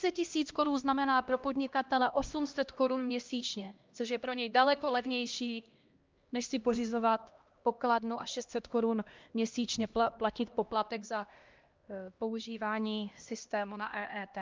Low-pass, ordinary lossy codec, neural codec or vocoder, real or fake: 7.2 kHz; Opus, 24 kbps; codec, 16 kHz, 1 kbps, X-Codec, HuBERT features, trained on LibriSpeech; fake